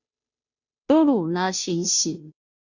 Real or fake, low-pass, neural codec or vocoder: fake; 7.2 kHz; codec, 16 kHz, 0.5 kbps, FunCodec, trained on Chinese and English, 25 frames a second